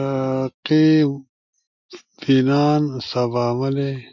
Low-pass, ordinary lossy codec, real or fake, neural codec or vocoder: 7.2 kHz; MP3, 48 kbps; real; none